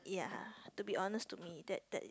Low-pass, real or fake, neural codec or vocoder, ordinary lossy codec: none; real; none; none